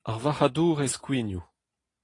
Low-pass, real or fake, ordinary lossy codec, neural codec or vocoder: 10.8 kHz; real; AAC, 32 kbps; none